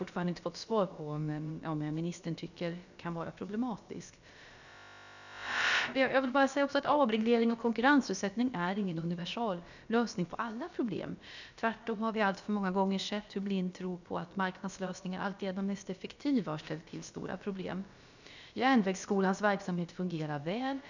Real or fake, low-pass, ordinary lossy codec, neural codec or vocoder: fake; 7.2 kHz; none; codec, 16 kHz, about 1 kbps, DyCAST, with the encoder's durations